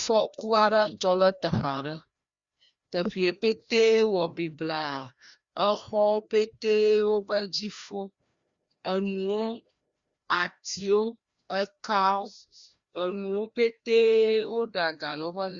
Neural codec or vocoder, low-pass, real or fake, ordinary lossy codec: codec, 16 kHz, 1 kbps, FreqCodec, larger model; 7.2 kHz; fake; Opus, 64 kbps